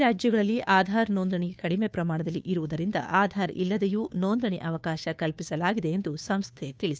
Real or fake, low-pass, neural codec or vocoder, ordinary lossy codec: fake; none; codec, 16 kHz, 2 kbps, FunCodec, trained on Chinese and English, 25 frames a second; none